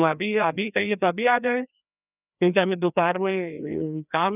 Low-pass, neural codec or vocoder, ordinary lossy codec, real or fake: 3.6 kHz; codec, 16 kHz, 1 kbps, FreqCodec, larger model; none; fake